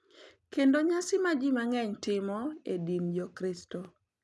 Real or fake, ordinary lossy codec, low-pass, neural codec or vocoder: real; none; none; none